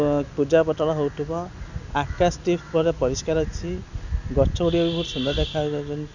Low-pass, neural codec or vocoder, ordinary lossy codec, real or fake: 7.2 kHz; none; none; real